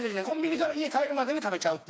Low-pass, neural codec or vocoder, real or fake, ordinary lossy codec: none; codec, 16 kHz, 2 kbps, FreqCodec, smaller model; fake; none